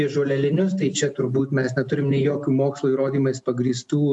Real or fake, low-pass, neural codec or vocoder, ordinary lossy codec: real; 10.8 kHz; none; AAC, 64 kbps